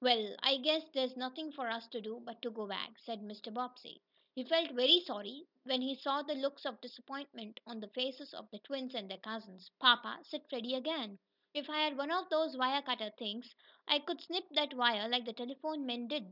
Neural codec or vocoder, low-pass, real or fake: none; 5.4 kHz; real